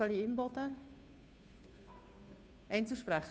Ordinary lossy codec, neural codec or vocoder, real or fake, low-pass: none; codec, 16 kHz, 2 kbps, FunCodec, trained on Chinese and English, 25 frames a second; fake; none